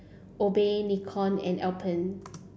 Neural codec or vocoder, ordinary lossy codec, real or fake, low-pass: none; none; real; none